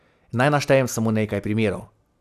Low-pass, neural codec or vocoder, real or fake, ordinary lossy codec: 14.4 kHz; none; real; none